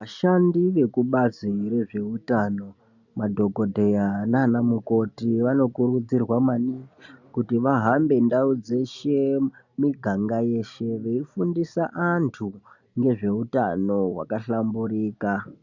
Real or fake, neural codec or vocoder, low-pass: real; none; 7.2 kHz